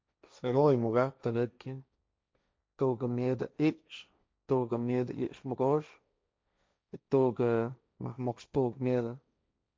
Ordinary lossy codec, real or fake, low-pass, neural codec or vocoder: none; fake; none; codec, 16 kHz, 1.1 kbps, Voila-Tokenizer